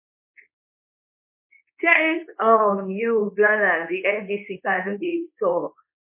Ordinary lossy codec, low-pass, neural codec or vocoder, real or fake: MP3, 24 kbps; 3.6 kHz; codec, 24 kHz, 0.9 kbps, WavTokenizer, medium speech release version 2; fake